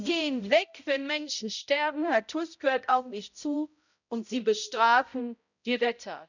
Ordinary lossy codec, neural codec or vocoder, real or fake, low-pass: none; codec, 16 kHz, 0.5 kbps, X-Codec, HuBERT features, trained on balanced general audio; fake; 7.2 kHz